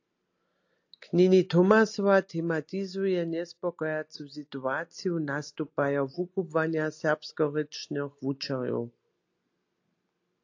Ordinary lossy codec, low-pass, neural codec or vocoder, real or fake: AAC, 48 kbps; 7.2 kHz; none; real